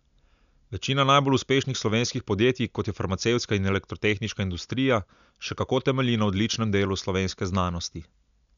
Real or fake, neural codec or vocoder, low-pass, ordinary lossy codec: real; none; 7.2 kHz; none